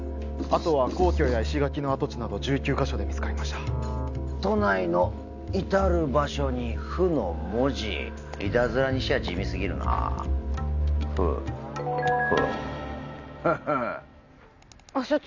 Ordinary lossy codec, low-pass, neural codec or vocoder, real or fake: none; 7.2 kHz; none; real